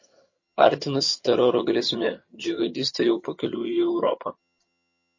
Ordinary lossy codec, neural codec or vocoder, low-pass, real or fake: MP3, 32 kbps; vocoder, 22.05 kHz, 80 mel bands, HiFi-GAN; 7.2 kHz; fake